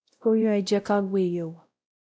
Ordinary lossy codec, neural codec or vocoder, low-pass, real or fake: none; codec, 16 kHz, 0.5 kbps, X-Codec, WavLM features, trained on Multilingual LibriSpeech; none; fake